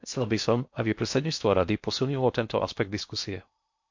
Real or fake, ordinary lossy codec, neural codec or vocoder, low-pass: fake; MP3, 48 kbps; codec, 16 kHz in and 24 kHz out, 0.6 kbps, FocalCodec, streaming, 2048 codes; 7.2 kHz